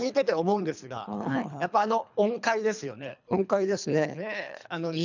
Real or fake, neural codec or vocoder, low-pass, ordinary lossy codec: fake; codec, 24 kHz, 3 kbps, HILCodec; 7.2 kHz; none